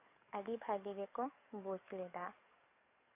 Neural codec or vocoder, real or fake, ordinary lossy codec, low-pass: codec, 44.1 kHz, 7.8 kbps, DAC; fake; AAC, 32 kbps; 3.6 kHz